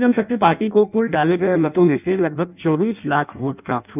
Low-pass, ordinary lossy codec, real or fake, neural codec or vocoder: 3.6 kHz; none; fake; codec, 16 kHz in and 24 kHz out, 0.6 kbps, FireRedTTS-2 codec